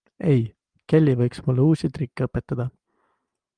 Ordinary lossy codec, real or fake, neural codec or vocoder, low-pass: Opus, 32 kbps; real; none; 9.9 kHz